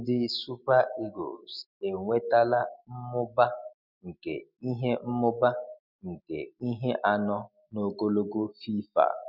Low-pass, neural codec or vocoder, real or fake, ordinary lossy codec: 5.4 kHz; none; real; none